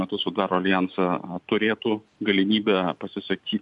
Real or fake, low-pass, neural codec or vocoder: fake; 10.8 kHz; vocoder, 44.1 kHz, 128 mel bands every 512 samples, BigVGAN v2